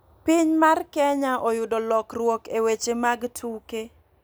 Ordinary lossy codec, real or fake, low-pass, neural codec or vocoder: none; real; none; none